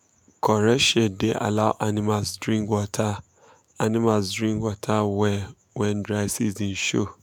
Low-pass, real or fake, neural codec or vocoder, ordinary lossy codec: 19.8 kHz; fake; autoencoder, 48 kHz, 128 numbers a frame, DAC-VAE, trained on Japanese speech; none